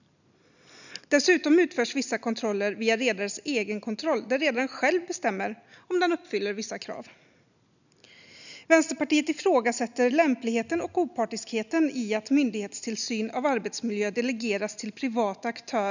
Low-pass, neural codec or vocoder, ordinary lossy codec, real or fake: 7.2 kHz; none; none; real